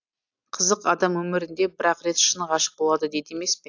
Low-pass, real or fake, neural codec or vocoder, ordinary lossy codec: 7.2 kHz; real; none; AAC, 48 kbps